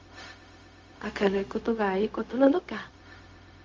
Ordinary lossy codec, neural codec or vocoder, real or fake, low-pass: Opus, 32 kbps; codec, 16 kHz, 0.4 kbps, LongCat-Audio-Codec; fake; 7.2 kHz